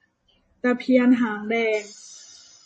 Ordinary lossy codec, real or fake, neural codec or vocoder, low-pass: MP3, 32 kbps; real; none; 10.8 kHz